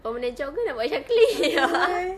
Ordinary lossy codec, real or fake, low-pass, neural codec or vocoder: none; real; 14.4 kHz; none